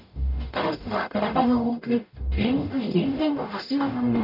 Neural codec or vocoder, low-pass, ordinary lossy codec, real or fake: codec, 44.1 kHz, 0.9 kbps, DAC; 5.4 kHz; AAC, 48 kbps; fake